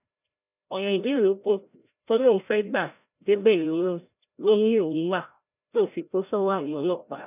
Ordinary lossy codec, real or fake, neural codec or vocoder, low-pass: none; fake; codec, 16 kHz, 0.5 kbps, FreqCodec, larger model; 3.6 kHz